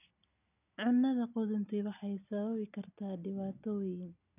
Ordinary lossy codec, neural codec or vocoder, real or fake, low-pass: none; none; real; 3.6 kHz